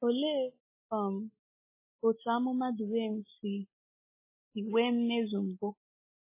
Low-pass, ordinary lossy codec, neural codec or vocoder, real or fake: 3.6 kHz; MP3, 16 kbps; none; real